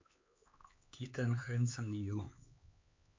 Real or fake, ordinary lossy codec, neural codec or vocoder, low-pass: fake; MP3, 48 kbps; codec, 16 kHz, 4 kbps, X-Codec, HuBERT features, trained on LibriSpeech; 7.2 kHz